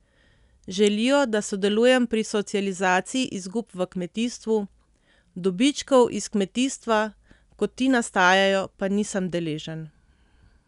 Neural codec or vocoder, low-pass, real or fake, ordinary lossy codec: none; 10.8 kHz; real; none